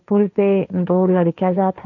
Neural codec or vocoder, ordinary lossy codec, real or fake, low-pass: codec, 16 kHz, 1.1 kbps, Voila-Tokenizer; none; fake; 7.2 kHz